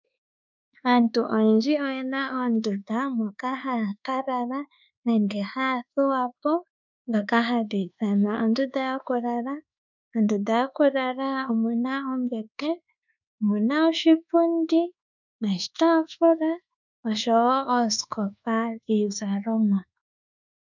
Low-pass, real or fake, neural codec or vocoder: 7.2 kHz; fake; codec, 24 kHz, 1.2 kbps, DualCodec